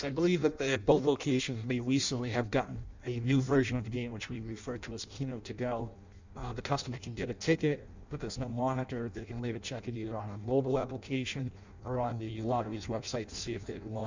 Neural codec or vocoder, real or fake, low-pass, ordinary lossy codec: codec, 16 kHz in and 24 kHz out, 0.6 kbps, FireRedTTS-2 codec; fake; 7.2 kHz; Opus, 64 kbps